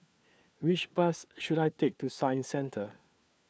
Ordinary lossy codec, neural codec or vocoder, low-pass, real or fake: none; codec, 16 kHz, 4 kbps, FunCodec, trained on LibriTTS, 50 frames a second; none; fake